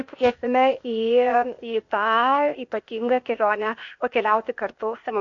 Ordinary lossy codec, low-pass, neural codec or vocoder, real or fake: AAC, 48 kbps; 7.2 kHz; codec, 16 kHz, 0.8 kbps, ZipCodec; fake